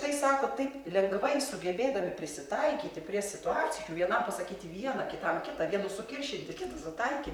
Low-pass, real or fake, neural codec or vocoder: 19.8 kHz; fake; vocoder, 44.1 kHz, 128 mel bands, Pupu-Vocoder